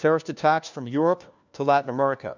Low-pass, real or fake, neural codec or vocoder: 7.2 kHz; fake; codec, 16 kHz, 1 kbps, FunCodec, trained on LibriTTS, 50 frames a second